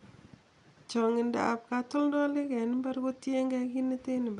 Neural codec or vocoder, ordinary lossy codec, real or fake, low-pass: none; none; real; 10.8 kHz